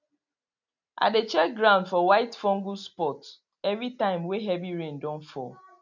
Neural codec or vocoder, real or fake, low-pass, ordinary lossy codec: none; real; 7.2 kHz; none